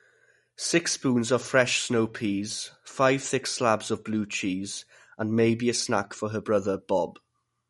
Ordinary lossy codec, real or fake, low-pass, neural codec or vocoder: MP3, 48 kbps; real; 19.8 kHz; none